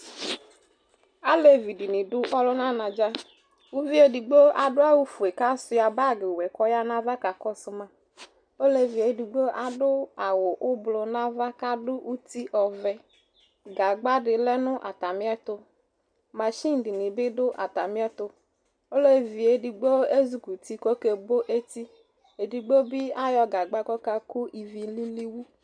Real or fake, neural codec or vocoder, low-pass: real; none; 9.9 kHz